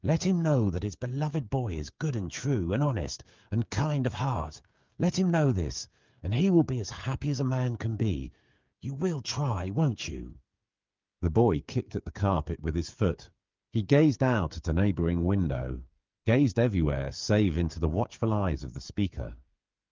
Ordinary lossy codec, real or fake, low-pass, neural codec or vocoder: Opus, 24 kbps; fake; 7.2 kHz; codec, 16 kHz, 8 kbps, FreqCodec, smaller model